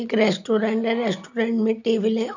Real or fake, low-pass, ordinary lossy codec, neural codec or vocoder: real; 7.2 kHz; none; none